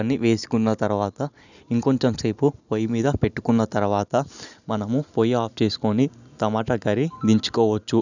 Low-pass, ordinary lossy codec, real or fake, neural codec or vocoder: 7.2 kHz; none; real; none